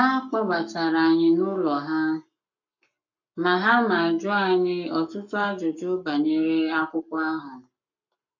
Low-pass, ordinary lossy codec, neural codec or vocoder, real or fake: 7.2 kHz; none; codec, 44.1 kHz, 7.8 kbps, Pupu-Codec; fake